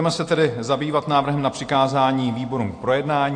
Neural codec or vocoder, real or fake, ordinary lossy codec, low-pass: none; real; AAC, 48 kbps; 9.9 kHz